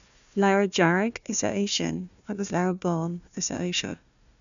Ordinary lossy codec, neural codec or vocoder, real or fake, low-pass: MP3, 96 kbps; codec, 16 kHz, 1 kbps, FunCodec, trained on Chinese and English, 50 frames a second; fake; 7.2 kHz